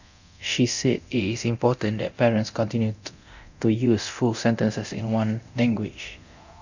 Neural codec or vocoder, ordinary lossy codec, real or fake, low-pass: codec, 24 kHz, 0.9 kbps, DualCodec; none; fake; 7.2 kHz